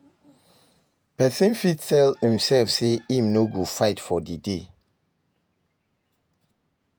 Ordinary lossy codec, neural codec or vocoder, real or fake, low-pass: none; vocoder, 48 kHz, 128 mel bands, Vocos; fake; none